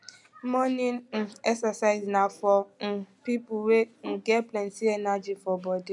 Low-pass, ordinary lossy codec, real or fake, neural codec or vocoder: 10.8 kHz; none; real; none